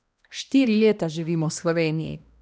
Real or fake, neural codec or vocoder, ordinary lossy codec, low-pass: fake; codec, 16 kHz, 1 kbps, X-Codec, HuBERT features, trained on balanced general audio; none; none